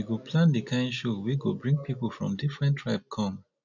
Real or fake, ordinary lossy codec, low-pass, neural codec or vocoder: real; none; 7.2 kHz; none